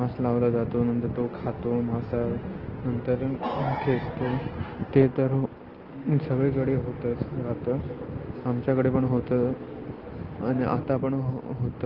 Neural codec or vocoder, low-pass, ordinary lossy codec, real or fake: none; 5.4 kHz; Opus, 16 kbps; real